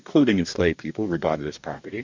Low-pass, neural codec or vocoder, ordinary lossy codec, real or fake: 7.2 kHz; codec, 44.1 kHz, 2.6 kbps, DAC; MP3, 64 kbps; fake